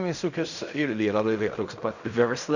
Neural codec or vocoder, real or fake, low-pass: codec, 16 kHz in and 24 kHz out, 0.4 kbps, LongCat-Audio-Codec, fine tuned four codebook decoder; fake; 7.2 kHz